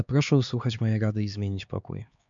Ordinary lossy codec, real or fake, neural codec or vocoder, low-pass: MP3, 64 kbps; fake; codec, 16 kHz, 4 kbps, X-Codec, HuBERT features, trained on LibriSpeech; 7.2 kHz